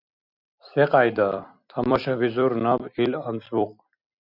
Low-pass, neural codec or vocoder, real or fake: 5.4 kHz; vocoder, 44.1 kHz, 128 mel bands every 256 samples, BigVGAN v2; fake